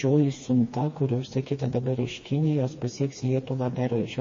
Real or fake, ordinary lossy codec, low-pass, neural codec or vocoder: fake; MP3, 32 kbps; 7.2 kHz; codec, 16 kHz, 2 kbps, FreqCodec, smaller model